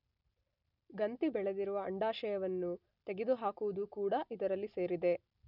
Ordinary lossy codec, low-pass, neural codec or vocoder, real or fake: none; 5.4 kHz; none; real